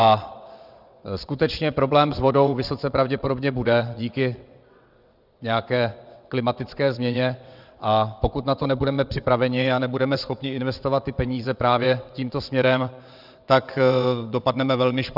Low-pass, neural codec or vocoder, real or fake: 5.4 kHz; vocoder, 22.05 kHz, 80 mel bands, WaveNeXt; fake